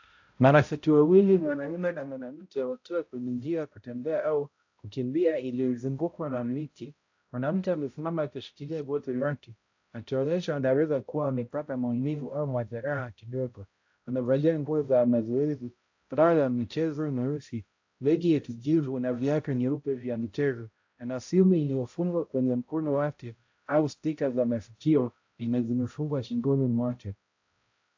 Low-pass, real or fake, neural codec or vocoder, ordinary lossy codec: 7.2 kHz; fake; codec, 16 kHz, 0.5 kbps, X-Codec, HuBERT features, trained on balanced general audio; AAC, 48 kbps